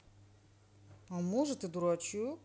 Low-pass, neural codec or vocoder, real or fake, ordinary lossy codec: none; none; real; none